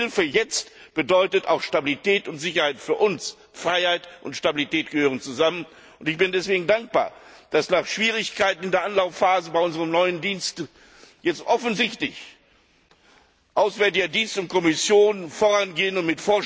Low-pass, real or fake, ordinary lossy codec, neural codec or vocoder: none; real; none; none